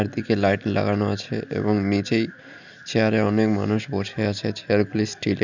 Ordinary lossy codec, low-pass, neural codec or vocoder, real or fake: none; 7.2 kHz; none; real